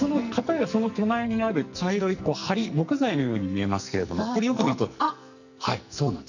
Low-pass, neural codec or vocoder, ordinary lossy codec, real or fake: 7.2 kHz; codec, 44.1 kHz, 2.6 kbps, SNAC; none; fake